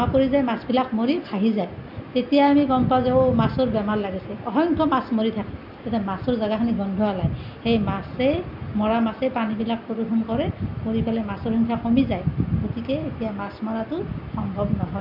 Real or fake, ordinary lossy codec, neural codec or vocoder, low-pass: real; MP3, 48 kbps; none; 5.4 kHz